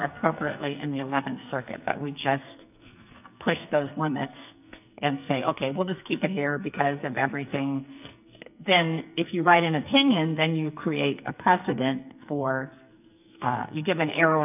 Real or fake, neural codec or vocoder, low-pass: fake; codec, 44.1 kHz, 2.6 kbps, SNAC; 3.6 kHz